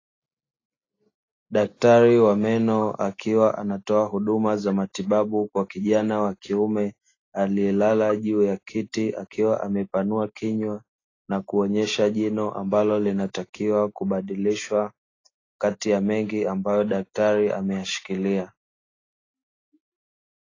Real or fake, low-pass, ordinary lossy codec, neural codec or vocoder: real; 7.2 kHz; AAC, 32 kbps; none